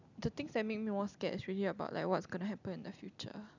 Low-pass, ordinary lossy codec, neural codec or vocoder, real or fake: 7.2 kHz; none; none; real